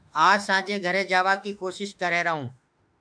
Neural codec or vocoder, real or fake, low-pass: autoencoder, 48 kHz, 32 numbers a frame, DAC-VAE, trained on Japanese speech; fake; 9.9 kHz